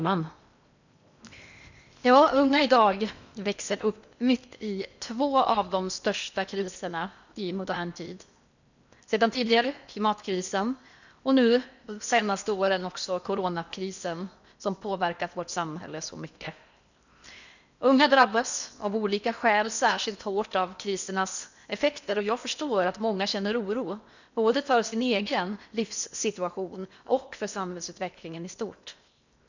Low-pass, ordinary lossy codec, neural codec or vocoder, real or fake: 7.2 kHz; none; codec, 16 kHz in and 24 kHz out, 0.8 kbps, FocalCodec, streaming, 65536 codes; fake